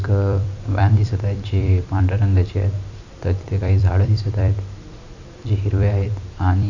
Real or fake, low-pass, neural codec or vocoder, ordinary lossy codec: fake; 7.2 kHz; vocoder, 44.1 kHz, 128 mel bands every 256 samples, BigVGAN v2; none